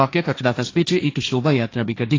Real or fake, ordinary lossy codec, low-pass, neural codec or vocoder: fake; AAC, 32 kbps; 7.2 kHz; codec, 16 kHz, 1.1 kbps, Voila-Tokenizer